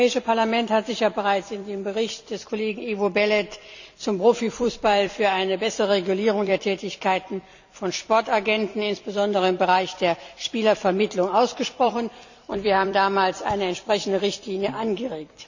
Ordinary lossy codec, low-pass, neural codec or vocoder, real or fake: none; 7.2 kHz; vocoder, 44.1 kHz, 128 mel bands every 256 samples, BigVGAN v2; fake